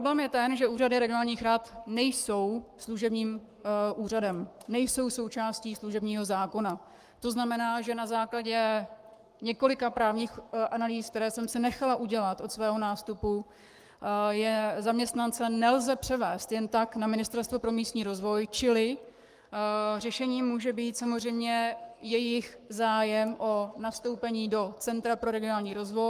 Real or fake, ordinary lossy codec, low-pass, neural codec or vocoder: fake; Opus, 32 kbps; 14.4 kHz; codec, 44.1 kHz, 7.8 kbps, Pupu-Codec